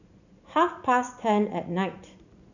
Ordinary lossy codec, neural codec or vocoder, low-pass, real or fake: none; vocoder, 44.1 kHz, 80 mel bands, Vocos; 7.2 kHz; fake